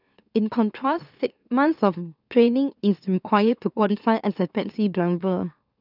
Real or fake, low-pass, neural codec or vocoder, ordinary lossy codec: fake; 5.4 kHz; autoencoder, 44.1 kHz, a latent of 192 numbers a frame, MeloTTS; none